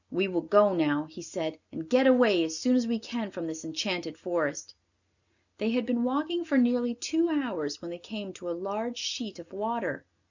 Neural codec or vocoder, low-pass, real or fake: none; 7.2 kHz; real